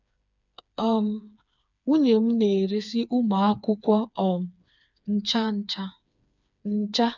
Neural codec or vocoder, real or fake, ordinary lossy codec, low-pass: codec, 16 kHz, 4 kbps, FreqCodec, smaller model; fake; none; 7.2 kHz